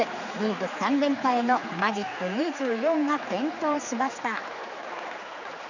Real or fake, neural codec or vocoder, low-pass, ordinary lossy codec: fake; codec, 16 kHz, 4 kbps, X-Codec, HuBERT features, trained on general audio; 7.2 kHz; none